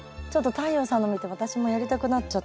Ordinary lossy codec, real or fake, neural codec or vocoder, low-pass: none; real; none; none